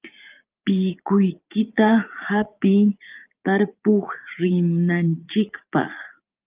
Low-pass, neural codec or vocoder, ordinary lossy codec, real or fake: 3.6 kHz; vocoder, 44.1 kHz, 80 mel bands, Vocos; Opus, 32 kbps; fake